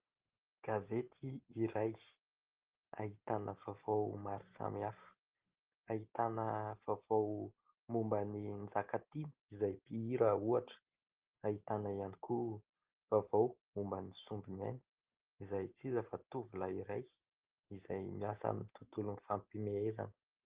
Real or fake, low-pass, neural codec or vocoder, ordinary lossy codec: real; 3.6 kHz; none; Opus, 16 kbps